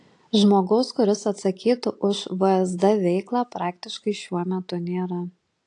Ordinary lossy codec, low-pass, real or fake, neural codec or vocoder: AAC, 48 kbps; 10.8 kHz; real; none